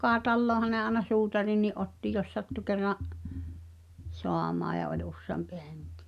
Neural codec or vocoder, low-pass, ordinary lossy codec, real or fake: none; 14.4 kHz; none; real